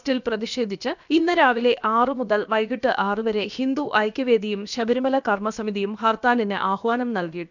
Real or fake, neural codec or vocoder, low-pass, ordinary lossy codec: fake; codec, 16 kHz, about 1 kbps, DyCAST, with the encoder's durations; 7.2 kHz; none